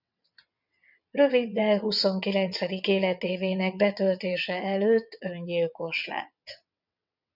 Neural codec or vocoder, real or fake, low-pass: vocoder, 22.05 kHz, 80 mel bands, WaveNeXt; fake; 5.4 kHz